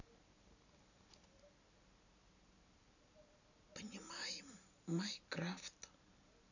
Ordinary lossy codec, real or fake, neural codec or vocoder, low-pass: none; real; none; 7.2 kHz